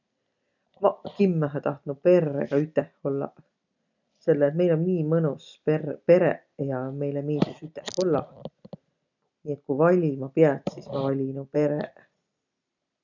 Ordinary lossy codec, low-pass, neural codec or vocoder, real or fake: none; 7.2 kHz; none; real